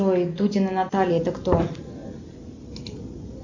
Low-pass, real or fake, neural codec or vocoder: 7.2 kHz; real; none